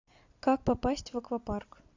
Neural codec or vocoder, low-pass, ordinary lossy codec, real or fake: none; 7.2 kHz; AAC, 48 kbps; real